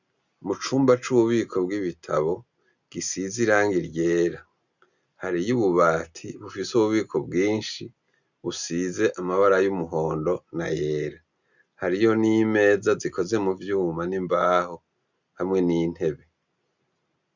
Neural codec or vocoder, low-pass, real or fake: none; 7.2 kHz; real